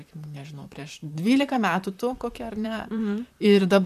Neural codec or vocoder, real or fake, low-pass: vocoder, 44.1 kHz, 128 mel bands, Pupu-Vocoder; fake; 14.4 kHz